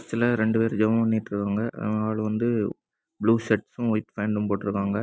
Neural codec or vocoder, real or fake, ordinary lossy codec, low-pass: none; real; none; none